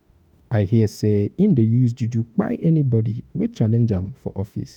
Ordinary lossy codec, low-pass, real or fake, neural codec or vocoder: none; 19.8 kHz; fake; autoencoder, 48 kHz, 32 numbers a frame, DAC-VAE, trained on Japanese speech